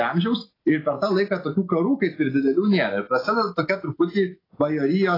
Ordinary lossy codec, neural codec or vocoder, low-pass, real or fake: AAC, 24 kbps; none; 5.4 kHz; real